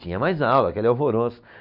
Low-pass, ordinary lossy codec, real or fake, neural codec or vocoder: 5.4 kHz; none; real; none